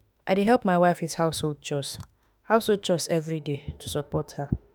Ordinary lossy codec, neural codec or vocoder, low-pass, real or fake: none; autoencoder, 48 kHz, 32 numbers a frame, DAC-VAE, trained on Japanese speech; none; fake